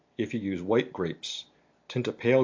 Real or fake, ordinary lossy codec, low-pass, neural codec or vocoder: real; AAC, 48 kbps; 7.2 kHz; none